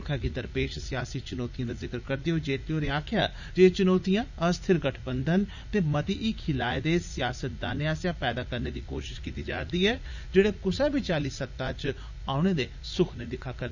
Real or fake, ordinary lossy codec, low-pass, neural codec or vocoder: fake; none; 7.2 kHz; vocoder, 44.1 kHz, 80 mel bands, Vocos